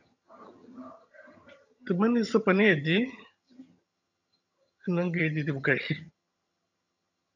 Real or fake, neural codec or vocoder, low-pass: fake; vocoder, 22.05 kHz, 80 mel bands, HiFi-GAN; 7.2 kHz